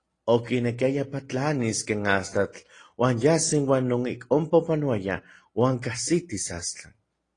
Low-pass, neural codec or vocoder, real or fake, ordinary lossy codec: 9.9 kHz; none; real; AAC, 32 kbps